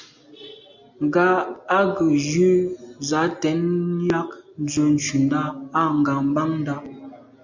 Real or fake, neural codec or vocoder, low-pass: real; none; 7.2 kHz